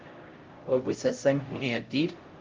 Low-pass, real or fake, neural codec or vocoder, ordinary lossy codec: 7.2 kHz; fake; codec, 16 kHz, 0.5 kbps, X-Codec, HuBERT features, trained on LibriSpeech; Opus, 32 kbps